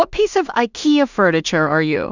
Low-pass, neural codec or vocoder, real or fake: 7.2 kHz; codec, 16 kHz in and 24 kHz out, 0.4 kbps, LongCat-Audio-Codec, two codebook decoder; fake